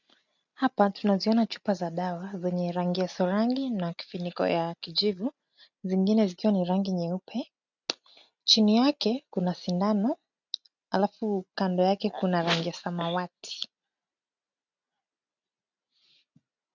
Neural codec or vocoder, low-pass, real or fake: none; 7.2 kHz; real